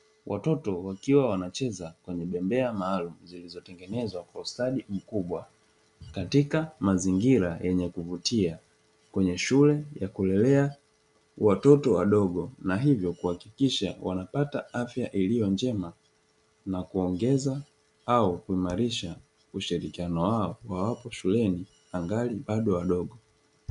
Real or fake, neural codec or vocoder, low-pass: real; none; 10.8 kHz